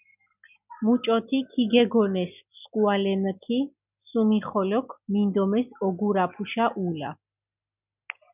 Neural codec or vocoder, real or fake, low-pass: none; real; 3.6 kHz